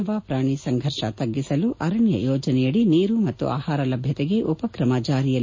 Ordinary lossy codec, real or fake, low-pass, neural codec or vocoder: MP3, 32 kbps; real; 7.2 kHz; none